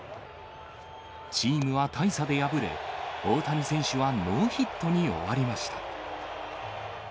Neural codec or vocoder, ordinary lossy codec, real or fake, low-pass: none; none; real; none